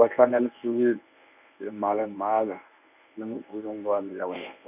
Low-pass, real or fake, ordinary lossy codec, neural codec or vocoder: 3.6 kHz; fake; none; codec, 24 kHz, 0.9 kbps, WavTokenizer, medium speech release version 1